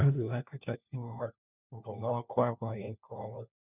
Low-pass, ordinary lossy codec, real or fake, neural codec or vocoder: 3.6 kHz; none; fake; codec, 16 kHz, 1 kbps, FunCodec, trained on LibriTTS, 50 frames a second